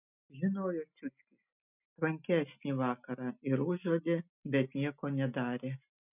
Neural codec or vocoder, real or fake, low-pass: none; real; 3.6 kHz